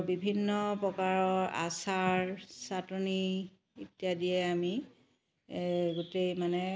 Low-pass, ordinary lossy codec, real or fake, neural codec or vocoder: none; none; real; none